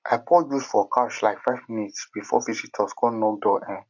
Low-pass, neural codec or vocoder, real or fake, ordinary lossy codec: 7.2 kHz; none; real; none